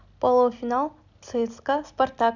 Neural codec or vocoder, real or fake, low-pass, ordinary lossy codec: none; real; 7.2 kHz; none